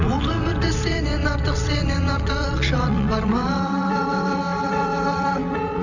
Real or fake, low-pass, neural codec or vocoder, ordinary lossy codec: fake; 7.2 kHz; vocoder, 22.05 kHz, 80 mel bands, WaveNeXt; none